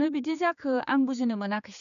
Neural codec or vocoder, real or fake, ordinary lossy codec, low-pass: codec, 16 kHz, 4 kbps, X-Codec, HuBERT features, trained on general audio; fake; none; 7.2 kHz